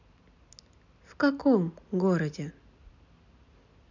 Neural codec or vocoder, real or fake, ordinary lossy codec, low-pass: none; real; none; 7.2 kHz